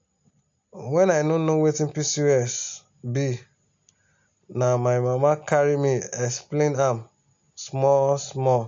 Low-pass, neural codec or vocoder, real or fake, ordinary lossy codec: 7.2 kHz; none; real; AAC, 64 kbps